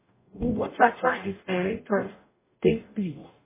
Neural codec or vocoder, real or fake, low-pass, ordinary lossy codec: codec, 44.1 kHz, 0.9 kbps, DAC; fake; 3.6 kHz; MP3, 16 kbps